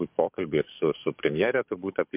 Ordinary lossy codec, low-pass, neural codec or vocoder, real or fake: MP3, 32 kbps; 3.6 kHz; codec, 44.1 kHz, 7.8 kbps, DAC; fake